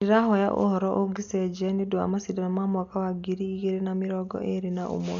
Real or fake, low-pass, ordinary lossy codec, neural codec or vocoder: real; 7.2 kHz; none; none